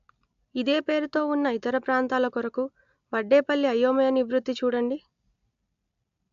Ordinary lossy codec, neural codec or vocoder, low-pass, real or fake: AAC, 64 kbps; none; 7.2 kHz; real